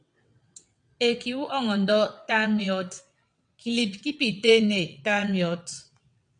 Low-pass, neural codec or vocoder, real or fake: 9.9 kHz; vocoder, 22.05 kHz, 80 mel bands, WaveNeXt; fake